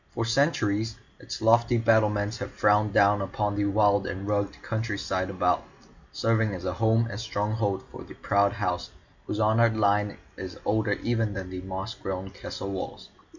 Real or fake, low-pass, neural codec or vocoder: real; 7.2 kHz; none